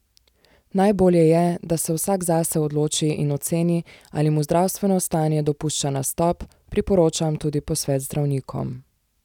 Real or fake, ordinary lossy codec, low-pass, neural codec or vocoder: real; none; 19.8 kHz; none